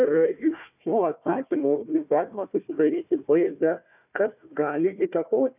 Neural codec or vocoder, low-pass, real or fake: codec, 16 kHz, 1 kbps, FunCodec, trained on Chinese and English, 50 frames a second; 3.6 kHz; fake